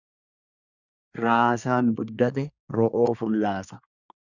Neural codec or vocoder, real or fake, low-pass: codec, 24 kHz, 1 kbps, SNAC; fake; 7.2 kHz